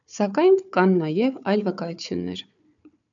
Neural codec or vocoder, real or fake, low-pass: codec, 16 kHz, 4 kbps, FunCodec, trained on Chinese and English, 50 frames a second; fake; 7.2 kHz